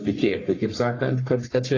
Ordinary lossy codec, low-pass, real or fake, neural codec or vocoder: AAC, 32 kbps; 7.2 kHz; fake; codec, 44.1 kHz, 1.7 kbps, Pupu-Codec